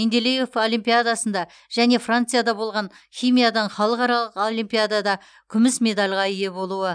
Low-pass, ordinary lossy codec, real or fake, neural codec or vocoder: 9.9 kHz; none; real; none